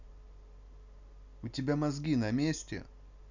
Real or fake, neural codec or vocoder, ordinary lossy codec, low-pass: real; none; none; 7.2 kHz